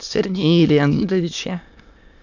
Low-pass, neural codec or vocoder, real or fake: 7.2 kHz; autoencoder, 22.05 kHz, a latent of 192 numbers a frame, VITS, trained on many speakers; fake